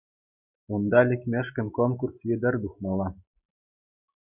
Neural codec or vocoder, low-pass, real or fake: none; 3.6 kHz; real